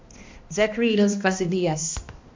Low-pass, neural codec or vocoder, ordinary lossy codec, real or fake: 7.2 kHz; codec, 16 kHz, 1 kbps, X-Codec, HuBERT features, trained on balanced general audio; MP3, 48 kbps; fake